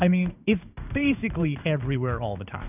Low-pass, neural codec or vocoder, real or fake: 3.6 kHz; codec, 16 kHz in and 24 kHz out, 1 kbps, XY-Tokenizer; fake